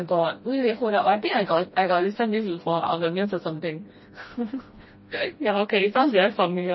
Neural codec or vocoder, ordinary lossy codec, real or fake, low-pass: codec, 16 kHz, 1 kbps, FreqCodec, smaller model; MP3, 24 kbps; fake; 7.2 kHz